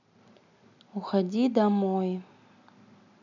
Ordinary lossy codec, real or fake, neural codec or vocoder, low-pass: none; real; none; 7.2 kHz